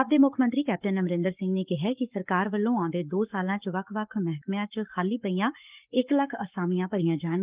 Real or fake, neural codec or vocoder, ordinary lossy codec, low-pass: fake; codec, 44.1 kHz, 7.8 kbps, Pupu-Codec; Opus, 24 kbps; 3.6 kHz